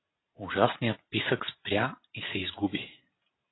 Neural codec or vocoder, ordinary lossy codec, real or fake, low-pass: none; AAC, 16 kbps; real; 7.2 kHz